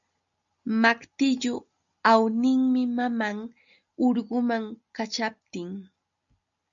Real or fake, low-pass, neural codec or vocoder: real; 7.2 kHz; none